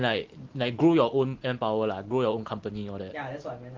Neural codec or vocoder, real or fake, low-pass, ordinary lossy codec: none; real; 7.2 kHz; Opus, 16 kbps